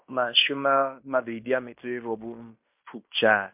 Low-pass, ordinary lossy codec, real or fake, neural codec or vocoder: 3.6 kHz; MP3, 32 kbps; fake; codec, 16 kHz in and 24 kHz out, 0.9 kbps, LongCat-Audio-Codec, fine tuned four codebook decoder